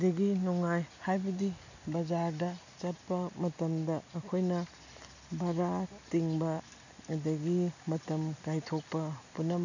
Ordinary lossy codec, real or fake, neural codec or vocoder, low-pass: none; real; none; 7.2 kHz